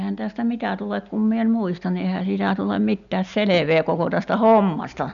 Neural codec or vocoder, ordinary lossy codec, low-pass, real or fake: none; none; 7.2 kHz; real